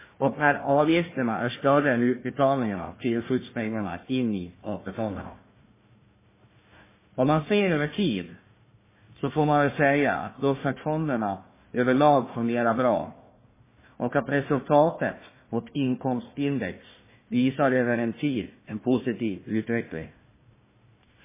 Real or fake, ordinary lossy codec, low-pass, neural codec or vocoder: fake; MP3, 16 kbps; 3.6 kHz; codec, 16 kHz, 1 kbps, FunCodec, trained on Chinese and English, 50 frames a second